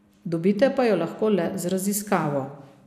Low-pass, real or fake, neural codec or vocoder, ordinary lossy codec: 14.4 kHz; fake; vocoder, 44.1 kHz, 128 mel bands every 256 samples, BigVGAN v2; none